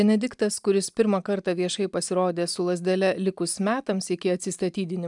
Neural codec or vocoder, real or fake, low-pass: none; real; 10.8 kHz